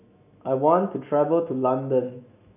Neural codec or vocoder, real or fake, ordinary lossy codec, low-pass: autoencoder, 48 kHz, 128 numbers a frame, DAC-VAE, trained on Japanese speech; fake; none; 3.6 kHz